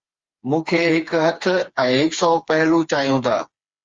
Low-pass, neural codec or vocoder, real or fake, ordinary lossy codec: 7.2 kHz; codec, 16 kHz, 4 kbps, FreqCodec, smaller model; fake; Opus, 32 kbps